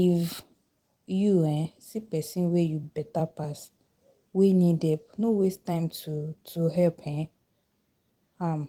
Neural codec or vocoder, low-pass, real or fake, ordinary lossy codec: vocoder, 44.1 kHz, 128 mel bands every 256 samples, BigVGAN v2; 19.8 kHz; fake; Opus, 24 kbps